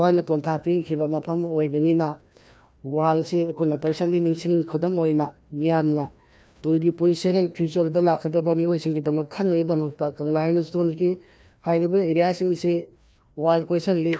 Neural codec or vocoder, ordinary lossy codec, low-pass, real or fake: codec, 16 kHz, 1 kbps, FreqCodec, larger model; none; none; fake